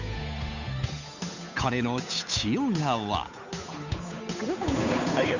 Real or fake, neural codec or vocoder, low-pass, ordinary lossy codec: fake; codec, 16 kHz, 8 kbps, FunCodec, trained on Chinese and English, 25 frames a second; 7.2 kHz; none